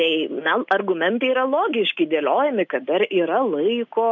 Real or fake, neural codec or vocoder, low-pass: real; none; 7.2 kHz